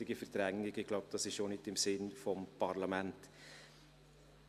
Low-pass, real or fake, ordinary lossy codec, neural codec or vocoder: 14.4 kHz; real; MP3, 96 kbps; none